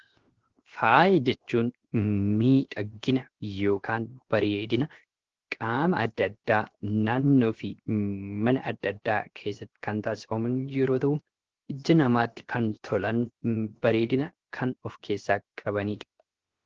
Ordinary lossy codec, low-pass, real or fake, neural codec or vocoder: Opus, 16 kbps; 7.2 kHz; fake; codec, 16 kHz, 0.7 kbps, FocalCodec